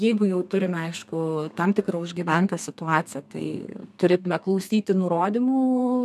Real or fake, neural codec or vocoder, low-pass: fake; codec, 32 kHz, 1.9 kbps, SNAC; 14.4 kHz